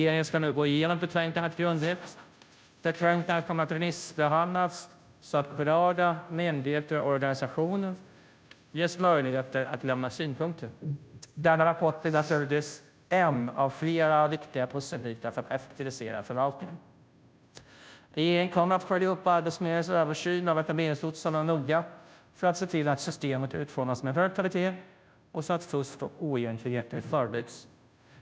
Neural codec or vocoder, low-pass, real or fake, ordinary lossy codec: codec, 16 kHz, 0.5 kbps, FunCodec, trained on Chinese and English, 25 frames a second; none; fake; none